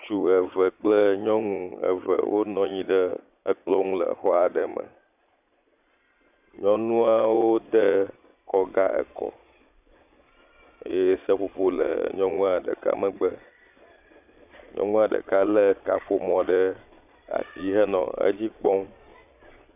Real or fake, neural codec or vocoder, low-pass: fake; vocoder, 22.05 kHz, 80 mel bands, Vocos; 3.6 kHz